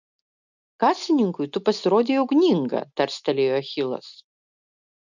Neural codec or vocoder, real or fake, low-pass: none; real; 7.2 kHz